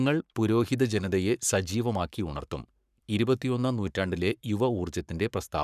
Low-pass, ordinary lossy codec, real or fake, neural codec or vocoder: 14.4 kHz; none; fake; codec, 44.1 kHz, 7.8 kbps, Pupu-Codec